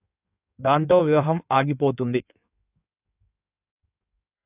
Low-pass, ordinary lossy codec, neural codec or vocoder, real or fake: 3.6 kHz; AAC, 32 kbps; codec, 16 kHz in and 24 kHz out, 1.1 kbps, FireRedTTS-2 codec; fake